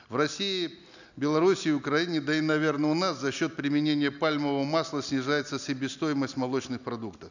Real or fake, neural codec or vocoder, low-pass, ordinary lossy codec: real; none; 7.2 kHz; none